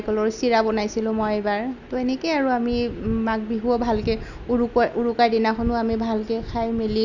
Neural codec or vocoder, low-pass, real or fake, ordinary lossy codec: none; 7.2 kHz; real; none